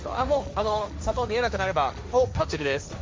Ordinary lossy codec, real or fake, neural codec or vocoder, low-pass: none; fake; codec, 16 kHz, 1.1 kbps, Voila-Tokenizer; none